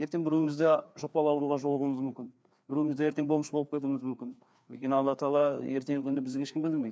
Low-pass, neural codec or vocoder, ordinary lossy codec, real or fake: none; codec, 16 kHz, 2 kbps, FreqCodec, larger model; none; fake